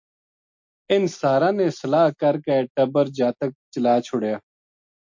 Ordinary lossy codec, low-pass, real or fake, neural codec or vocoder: MP3, 48 kbps; 7.2 kHz; real; none